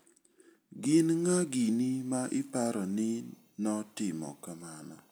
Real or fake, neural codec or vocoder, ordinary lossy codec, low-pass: real; none; none; none